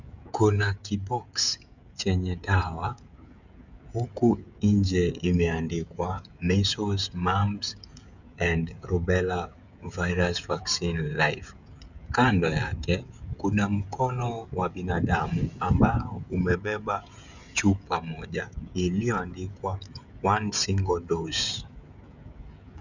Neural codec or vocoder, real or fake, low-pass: codec, 16 kHz, 16 kbps, FreqCodec, smaller model; fake; 7.2 kHz